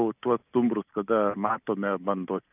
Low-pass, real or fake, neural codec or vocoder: 3.6 kHz; real; none